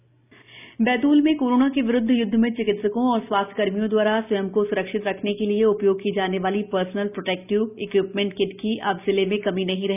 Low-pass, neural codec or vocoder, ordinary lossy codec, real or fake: 3.6 kHz; none; none; real